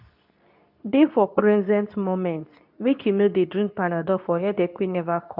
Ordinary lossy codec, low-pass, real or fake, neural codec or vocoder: none; 5.4 kHz; fake; codec, 24 kHz, 0.9 kbps, WavTokenizer, medium speech release version 2